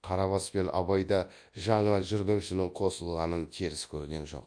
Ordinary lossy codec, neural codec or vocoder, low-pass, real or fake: AAC, 64 kbps; codec, 24 kHz, 0.9 kbps, WavTokenizer, large speech release; 9.9 kHz; fake